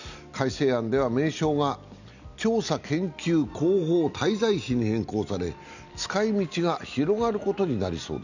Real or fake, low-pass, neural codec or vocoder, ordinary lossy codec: real; 7.2 kHz; none; none